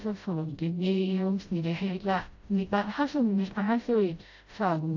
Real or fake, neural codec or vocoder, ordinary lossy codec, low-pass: fake; codec, 16 kHz, 0.5 kbps, FreqCodec, smaller model; AAC, 48 kbps; 7.2 kHz